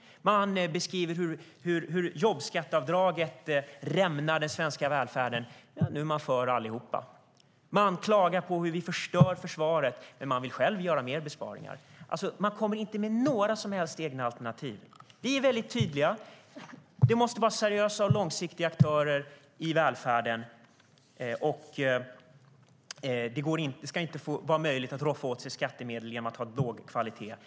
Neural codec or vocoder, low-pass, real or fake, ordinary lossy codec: none; none; real; none